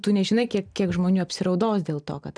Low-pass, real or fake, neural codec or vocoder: 9.9 kHz; real; none